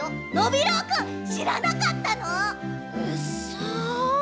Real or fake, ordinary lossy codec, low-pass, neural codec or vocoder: real; none; none; none